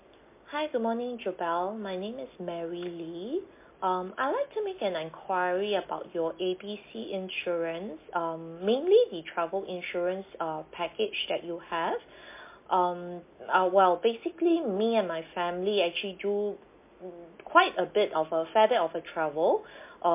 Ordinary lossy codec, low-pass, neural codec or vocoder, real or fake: MP3, 24 kbps; 3.6 kHz; none; real